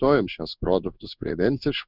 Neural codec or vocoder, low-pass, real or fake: codec, 16 kHz in and 24 kHz out, 1 kbps, XY-Tokenizer; 5.4 kHz; fake